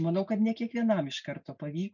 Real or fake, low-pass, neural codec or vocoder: real; 7.2 kHz; none